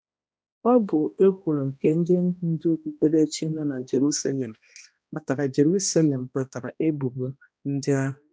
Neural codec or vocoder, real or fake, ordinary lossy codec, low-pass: codec, 16 kHz, 1 kbps, X-Codec, HuBERT features, trained on balanced general audio; fake; none; none